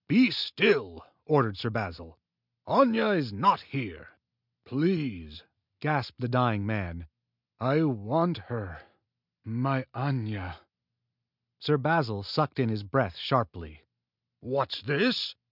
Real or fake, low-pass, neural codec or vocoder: real; 5.4 kHz; none